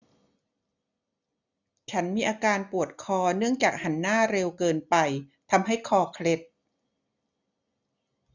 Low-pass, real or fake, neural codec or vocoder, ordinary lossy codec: 7.2 kHz; real; none; none